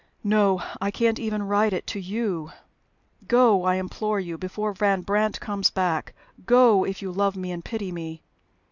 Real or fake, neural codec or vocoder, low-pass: real; none; 7.2 kHz